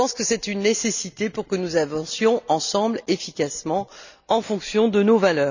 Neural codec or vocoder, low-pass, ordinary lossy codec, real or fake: none; 7.2 kHz; none; real